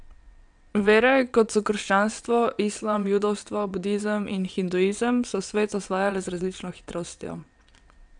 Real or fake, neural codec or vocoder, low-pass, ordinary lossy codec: fake; vocoder, 22.05 kHz, 80 mel bands, WaveNeXt; 9.9 kHz; none